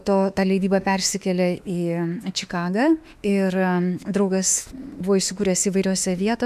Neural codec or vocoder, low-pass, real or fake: autoencoder, 48 kHz, 32 numbers a frame, DAC-VAE, trained on Japanese speech; 14.4 kHz; fake